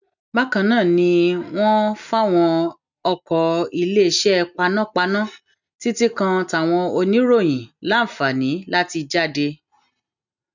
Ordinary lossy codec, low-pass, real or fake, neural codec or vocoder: none; 7.2 kHz; real; none